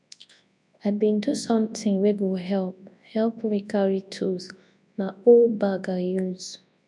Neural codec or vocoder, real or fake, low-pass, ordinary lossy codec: codec, 24 kHz, 0.9 kbps, WavTokenizer, large speech release; fake; 10.8 kHz; none